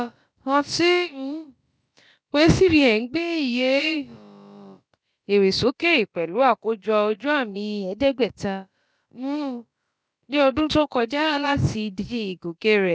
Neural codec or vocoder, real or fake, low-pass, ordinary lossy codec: codec, 16 kHz, about 1 kbps, DyCAST, with the encoder's durations; fake; none; none